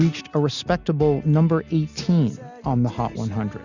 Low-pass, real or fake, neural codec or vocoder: 7.2 kHz; real; none